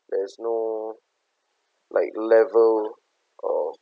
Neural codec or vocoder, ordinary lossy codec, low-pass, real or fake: none; none; none; real